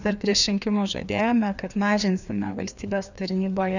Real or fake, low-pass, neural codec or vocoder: fake; 7.2 kHz; codec, 16 kHz, 2 kbps, FreqCodec, larger model